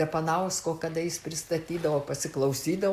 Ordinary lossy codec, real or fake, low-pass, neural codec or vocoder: Opus, 64 kbps; real; 14.4 kHz; none